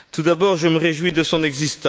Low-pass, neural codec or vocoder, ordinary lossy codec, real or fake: none; codec, 16 kHz, 6 kbps, DAC; none; fake